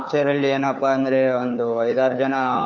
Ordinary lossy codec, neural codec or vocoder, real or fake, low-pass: none; codec, 16 kHz, 4 kbps, FunCodec, trained on LibriTTS, 50 frames a second; fake; 7.2 kHz